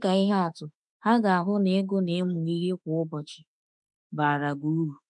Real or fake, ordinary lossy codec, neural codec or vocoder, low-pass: fake; none; autoencoder, 48 kHz, 32 numbers a frame, DAC-VAE, trained on Japanese speech; 10.8 kHz